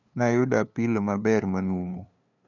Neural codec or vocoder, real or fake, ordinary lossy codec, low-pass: codec, 16 kHz, 2 kbps, FunCodec, trained on LibriTTS, 25 frames a second; fake; none; 7.2 kHz